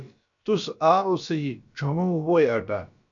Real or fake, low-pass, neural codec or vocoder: fake; 7.2 kHz; codec, 16 kHz, about 1 kbps, DyCAST, with the encoder's durations